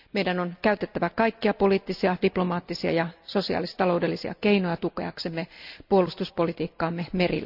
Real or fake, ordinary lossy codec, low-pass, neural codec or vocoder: real; none; 5.4 kHz; none